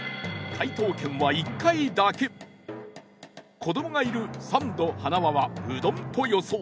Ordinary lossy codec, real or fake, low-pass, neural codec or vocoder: none; real; none; none